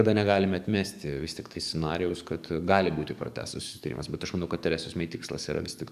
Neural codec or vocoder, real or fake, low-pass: codec, 44.1 kHz, 7.8 kbps, DAC; fake; 14.4 kHz